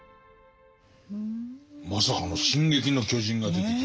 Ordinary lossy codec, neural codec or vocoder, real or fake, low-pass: none; none; real; none